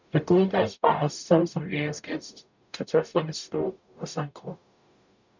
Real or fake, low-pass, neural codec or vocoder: fake; 7.2 kHz; codec, 44.1 kHz, 0.9 kbps, DAC